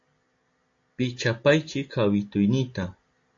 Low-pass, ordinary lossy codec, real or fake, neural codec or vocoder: 7.2 kHz; AAC, 48 kbps; real; none